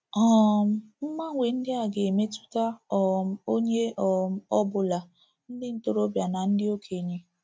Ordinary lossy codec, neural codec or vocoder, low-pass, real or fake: none; none; none; real